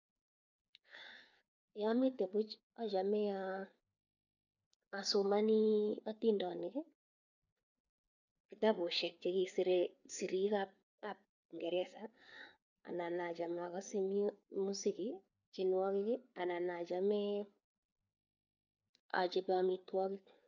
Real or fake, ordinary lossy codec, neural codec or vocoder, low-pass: fake; none; codec, 16 kHz, 4 kbps, FreqCodec, larger model; 7.2 kHz